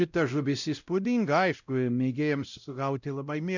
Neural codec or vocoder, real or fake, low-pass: codec, 16 kHz, 0.5 kbps, X-Codec, WavLM features, trained on Multilingual LibriSpeech; fake; 7.2 kHz